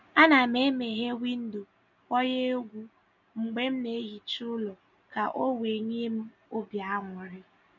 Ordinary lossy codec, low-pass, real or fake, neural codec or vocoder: none; 7.2 kHz; real; none